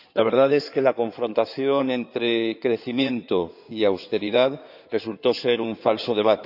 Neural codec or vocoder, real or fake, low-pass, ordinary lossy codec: codec, 16 kHz in and 24 kHz out, 2.2 kbps, FireRedTTS-2 codec; fake; 5.4 kHz; none